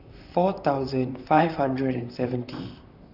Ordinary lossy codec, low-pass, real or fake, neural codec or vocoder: none; 5.4 kHz; fake; vocoder, 44.1 kHz, 128 mel bands, Pupu-Vocoder